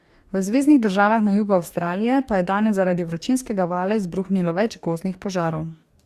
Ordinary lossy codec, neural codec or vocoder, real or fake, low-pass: Opus, 64 kbps; codec, 44.1 kHz, 2.6 kbps, DAC; fake; 14.4 kHz